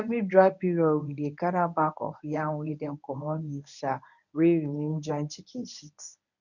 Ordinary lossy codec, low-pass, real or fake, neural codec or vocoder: none; 7.2 kHz; fake; codec, 24 kHz, 0.9 kbps, WavTokenizer, medium speech release version 1